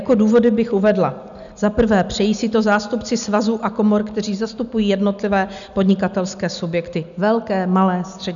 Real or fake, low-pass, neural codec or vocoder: real; 7.2 kHz; none